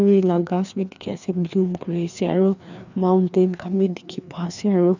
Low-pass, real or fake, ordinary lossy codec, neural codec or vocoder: 7.2 kHz; fake; none; codec, 16 kHz, 2 kbps, FreqCodec, larger model